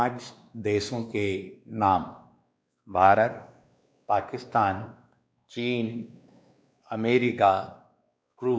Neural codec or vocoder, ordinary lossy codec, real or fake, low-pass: codec, 16 kHz, 2 kbps, X-Codec, WavLM features, trained on Multilingual LibriSpeech; none; fake; none